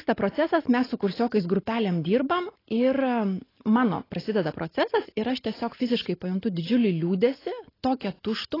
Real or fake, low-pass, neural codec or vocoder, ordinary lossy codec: real; 5.4 kHz; none; AAC, 24 kbps